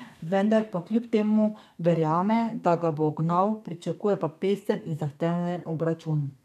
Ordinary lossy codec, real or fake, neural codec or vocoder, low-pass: none; fake; codec, 32 kHz, 1.9 kbps, SNAC; 14.4 kHz